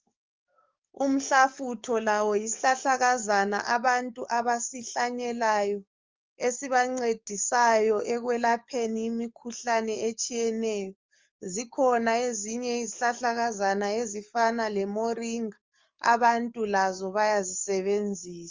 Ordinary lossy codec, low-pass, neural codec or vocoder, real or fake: Opus, 32 kbps; 7.2 kHz; codec, 44.1 kHz, 7.8 kbps, DAC; fake